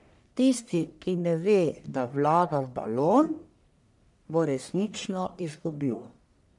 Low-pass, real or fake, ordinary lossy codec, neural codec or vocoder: 10.8 kHz; fake; none; codec, 44.1 kHz, 1.7 kbps, Pupu-Codec